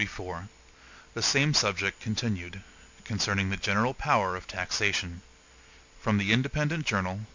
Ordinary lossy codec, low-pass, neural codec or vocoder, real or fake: MP3, 64 kbps; 7.2 kHz; none; real